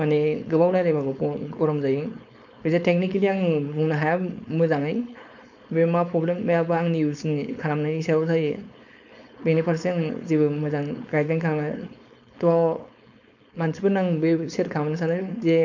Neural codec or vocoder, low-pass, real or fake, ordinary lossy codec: codec, 16 kHz, 4.8 kbps, FACodec; 7.2 kHz; fake; none